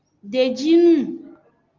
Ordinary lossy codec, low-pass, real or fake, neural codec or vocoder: Opus, 24 kbps; 7.2 kHz; real; none